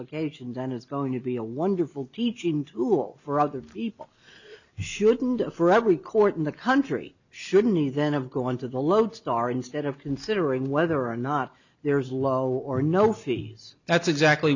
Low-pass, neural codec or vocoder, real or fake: 7.2 kHz; none; real